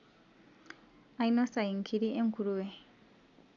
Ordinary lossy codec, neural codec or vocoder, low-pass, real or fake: none; none; 7.2 kHz; real